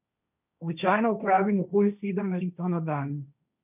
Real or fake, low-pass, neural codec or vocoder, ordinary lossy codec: fake; 3.6 kHz; codec, 16 kHz, 1.1 kbps, Voila-Tokenizer; none